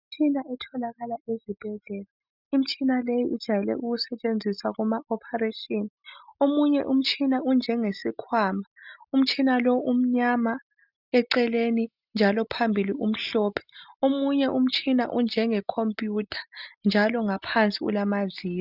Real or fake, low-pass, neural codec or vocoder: real; 5.4 kHz; none